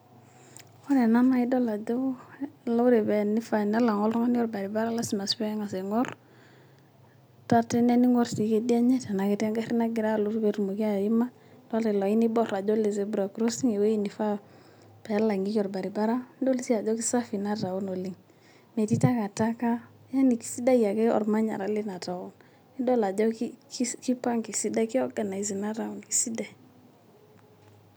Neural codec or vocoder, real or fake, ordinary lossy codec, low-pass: none; real; none; none